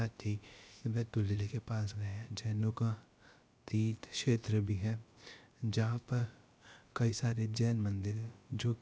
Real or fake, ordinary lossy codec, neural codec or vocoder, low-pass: fake; none; codec, 16 kHz, about 1 kbps, DyCAST, with the encoder's durations; none